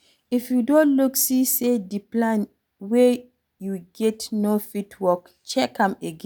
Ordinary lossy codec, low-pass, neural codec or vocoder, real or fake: none; none; none; real